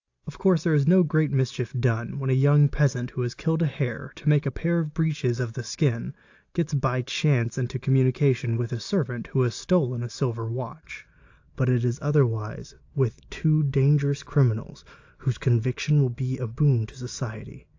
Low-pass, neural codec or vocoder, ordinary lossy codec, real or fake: 7.2 kHz; none; AAC, 48 kbps; real